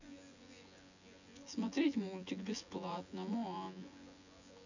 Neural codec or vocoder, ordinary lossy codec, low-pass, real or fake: vocoder, 24 kHz, 100 mel bands, Vocos; none; 7.2 kHz; fake